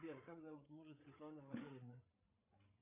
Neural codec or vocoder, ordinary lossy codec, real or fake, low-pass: codec, 16 kHz, 8 kbps, FreqCodec, larger model; AAC, 24 kbps; fake; 3.6 kHz